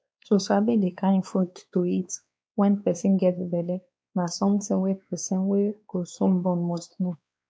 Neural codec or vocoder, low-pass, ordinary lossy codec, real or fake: codec, 16 kHz, 2 kbps, X-Codec, WavLM features, trained on Multilingual LibriSpeech; none; none; fake